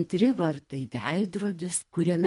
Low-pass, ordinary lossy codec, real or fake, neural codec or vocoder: 10.8 kHz; MP3, 64 kbps; fake; codec, 24 kHz, 1.5 kbps, HILCodec